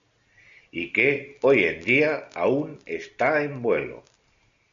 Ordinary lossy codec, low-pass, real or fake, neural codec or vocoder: MP3, 64 kbps; 7.2 kHz; real; none